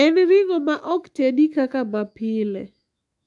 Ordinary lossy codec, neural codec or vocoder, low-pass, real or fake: AAC, 64 kbps; autoencoder, 48 kHz, 128 numbers a frame, DAC-VAE, trained on Japanese speech; 10.8 kHz; fake